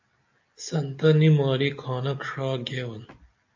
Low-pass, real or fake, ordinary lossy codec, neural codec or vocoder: 7.2 kHz; real; AAC, 48 kbps; none